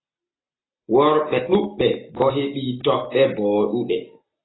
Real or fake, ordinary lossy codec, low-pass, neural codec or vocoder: real; AAC, 16 kbps; 7.2 kHz; none